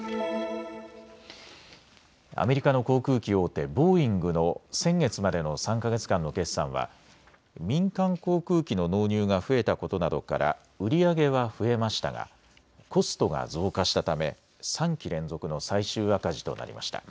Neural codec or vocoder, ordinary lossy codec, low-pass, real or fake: none; none; none; real